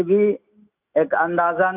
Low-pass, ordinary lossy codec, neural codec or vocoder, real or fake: 3.6 kHz; none; none; real